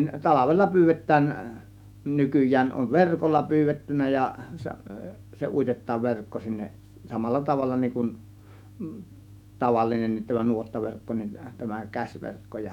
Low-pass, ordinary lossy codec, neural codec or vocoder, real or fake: 19.8 kHz; none; autoencoder, 48 kHz, 128 numbers a frame, DAC-VAE, trained on Japanese speech; fake